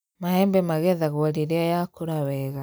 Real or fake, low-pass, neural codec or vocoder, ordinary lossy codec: real; none; none; none